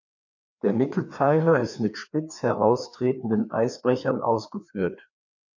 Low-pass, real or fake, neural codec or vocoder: 7.2 kHz; fake; codec, 16 kHz, 2 kbps, FreqCodec, larger model